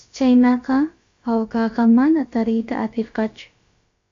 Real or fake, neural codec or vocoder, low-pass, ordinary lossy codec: fake; codec, 16 kHz, about 1 kbps, DyCAST, with the encoder's durations; 7.2 kHz; AAC, 48 kbps